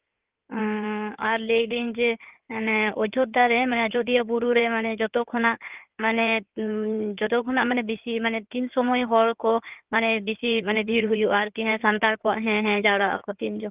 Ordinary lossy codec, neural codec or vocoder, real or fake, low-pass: Opus, 32 kbps; codec, 16 kHz in and 24 kHz out, 2.2 kbps, FireRedTTS-2 codec; fake; 3.6 kHz